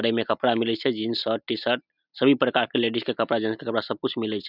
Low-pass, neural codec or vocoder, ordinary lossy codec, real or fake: 5.4 kHz; none; none; real